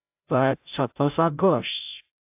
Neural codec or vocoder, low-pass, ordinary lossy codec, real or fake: codec, 16 kHz, 0.5 kbps, FreqCodec, larger model; 3.6 kHz; AAC, 32 kbps; fake